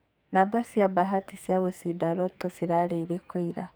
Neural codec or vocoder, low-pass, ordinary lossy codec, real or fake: codec, 44.1 kHz, 2.6 kbps, SNAC; none; none; fake